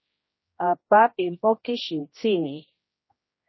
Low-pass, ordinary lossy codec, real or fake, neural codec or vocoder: 7.2 kHz; MP3, 24 kbps; fake; codec, 16 kHz, 1 kbps, X-Codec, HuBERT features, trained on general audio